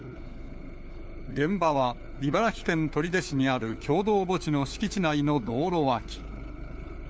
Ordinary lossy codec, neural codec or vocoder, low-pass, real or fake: none; codec, 16 kHz, 4 kbps, FunCodec, trained on LibriTTS, 50 frames a second; none; fake